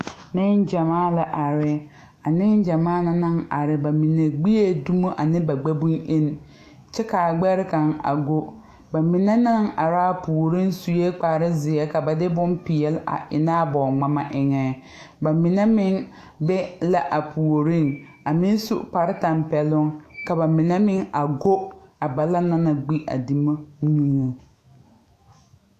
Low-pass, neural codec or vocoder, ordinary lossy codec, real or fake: 14.4 kHz; autoencoder, 48 kHz, 128 numbers a frame, DAC-VAE, trained on Japanese speech; AAC, 64 kbps; fake